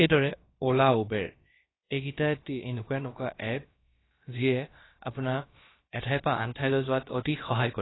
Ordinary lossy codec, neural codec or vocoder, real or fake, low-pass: AAC, 16 kbps; codec, 16 kHz, about 1 kbps, DyCAST, with the encoder's durations; fake; 7.2 kHz